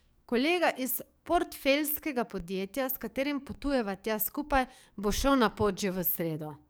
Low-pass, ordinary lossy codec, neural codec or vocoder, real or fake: none; none; codec, 44.1 kHz, 7.8 kbps, DAC; fake